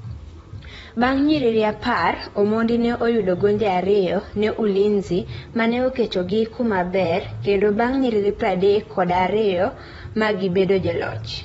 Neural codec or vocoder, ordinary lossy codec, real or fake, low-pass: vocoder, 44.1 kHz, 128 mel bands, Pupu-Vocoder; AAC, 24 kbps; fake; 19.8 kHz